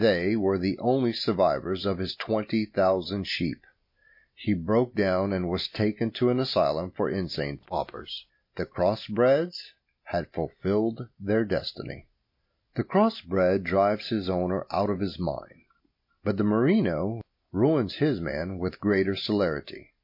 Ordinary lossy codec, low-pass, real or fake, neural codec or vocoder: MP3, 32 kbps; 5.4 kHz; real; none